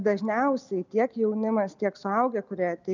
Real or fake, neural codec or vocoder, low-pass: real; none; 7.2 kHz